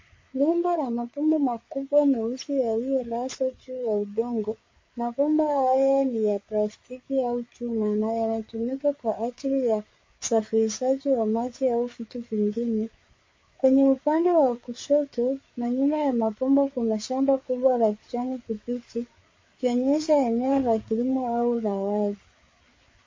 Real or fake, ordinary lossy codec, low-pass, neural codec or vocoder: fake; MP3, 32 kbps; 7.2 kHz; codec, 16 kHz, 4 kbps, FreqCodec, larger model